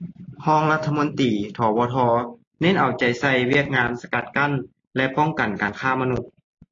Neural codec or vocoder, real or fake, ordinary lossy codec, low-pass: none; real; AAC, 32 kbps; 7.2 kHz